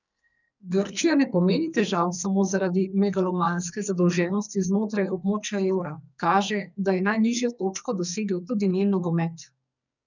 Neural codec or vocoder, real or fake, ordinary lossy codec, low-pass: codec, 44.1 kHz, 2.6 kbps, SNAC; fake; none; 7.2 kHz